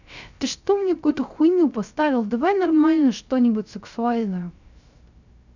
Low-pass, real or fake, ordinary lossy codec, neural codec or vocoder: 7.2 kHz; fake; none; codec, 16 kHz, 0.3 kbps, FocalCodec